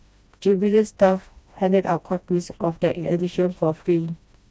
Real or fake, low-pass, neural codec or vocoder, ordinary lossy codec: fake; none; codec, 16 kHz, 1 kbps, FreqCodec, smaller model; none